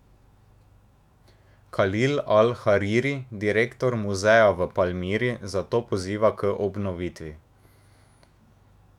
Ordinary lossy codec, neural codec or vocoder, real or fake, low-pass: none; autoencoder, 48 kHz, 128 numbers a frame, DAC-VAE, trained on Japanese speech; fake; 19.8 kHz